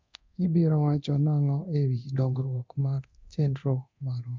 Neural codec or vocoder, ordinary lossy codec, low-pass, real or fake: codec, 24 kHz, 0.9 kbps, DualCodec; MP3, 64 kbps; 7.2 kHz; fake